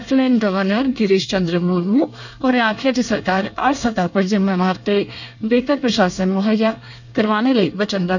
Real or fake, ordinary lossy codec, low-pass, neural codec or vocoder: fake; none; 7.2 kHz; codec, 24 kHz, 1 kbps, SNAC